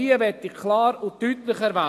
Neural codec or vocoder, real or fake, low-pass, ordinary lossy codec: none; real; 14.4 kHz; AAC, 64 kbps